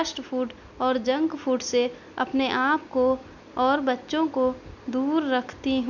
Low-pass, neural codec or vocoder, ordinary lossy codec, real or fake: 7.2 kHz; none; none; real